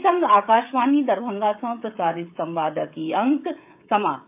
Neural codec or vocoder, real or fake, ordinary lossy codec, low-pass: codec, 16 kHz, 16 kbps, FreqCodec, smaller model; fake; AAC, 32 kbps; 3.6 kHz